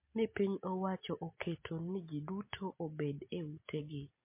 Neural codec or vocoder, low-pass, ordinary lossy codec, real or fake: none; 3.6 kHz; MP3, 24 kbps; real